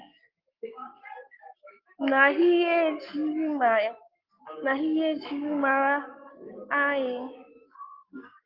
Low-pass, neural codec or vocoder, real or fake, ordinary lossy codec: 5.4 kHz; codec, 44.1 kHz, 7.8 kbps, Pupu-Codec; fake; Opus, 32 kbps